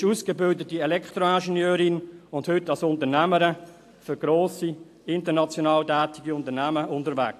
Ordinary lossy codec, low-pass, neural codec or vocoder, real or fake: AAC, 64 kbps; 14.4 kHz; none; real